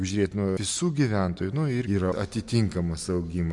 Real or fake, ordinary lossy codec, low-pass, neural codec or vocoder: real; MP3, 64 kbps; 10.8 kHz; none